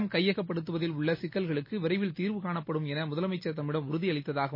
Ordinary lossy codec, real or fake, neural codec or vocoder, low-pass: MP3, 24 kbps; real; none; 5.4 kHz